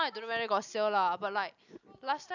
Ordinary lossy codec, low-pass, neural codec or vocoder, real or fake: none; 7.2 kHz; none; real